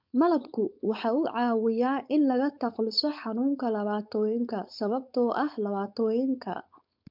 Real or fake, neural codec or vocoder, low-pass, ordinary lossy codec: fake; codec, 16 kHz, 4.8 kbps, FACodec; 5.4 kHz; MP3, 48 kbps